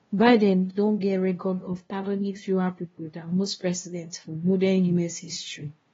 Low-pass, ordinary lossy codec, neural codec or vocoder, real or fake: 7.2 kHz; AAC, 24 kbps; codec, 16 kHz, 0.5 kbps, FunCodec, trained on LibriTTS, 25 frames a second; fake